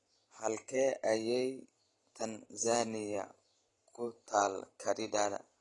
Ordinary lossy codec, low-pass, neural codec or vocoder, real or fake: AAC, 32 kbps; 10.8 kHz; vocoder, 44.1 kHz, 128 mel bands every 512 samples, BigVGAN v2; fake